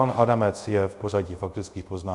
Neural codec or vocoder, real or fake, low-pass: codec, 24 kHz, 0.5 kbps, DualCodec; fake; 10.8 kHz